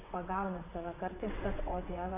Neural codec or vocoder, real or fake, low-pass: vocoder, 22.05 kHz, 80 mel bands, WaveNeXt; fake; 3.6 kHz